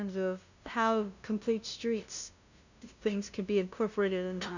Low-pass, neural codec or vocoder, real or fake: 7.2 kHz; codec, 16 kHz, 0.5 kbps, FunCodec, trained on LibriTTS, 25 frames a second; fake